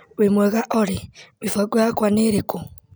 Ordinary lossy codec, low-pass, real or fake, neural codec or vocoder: none; none; real; none